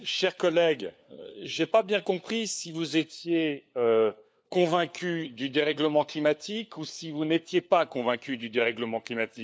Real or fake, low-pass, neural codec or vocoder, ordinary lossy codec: fake; none; codec, 16 kHz, 4 kbps, FunCodec, trained on LibriTTS, 50 frames a second; none